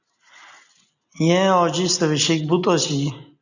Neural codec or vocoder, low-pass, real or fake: none; 7.2 kHz; real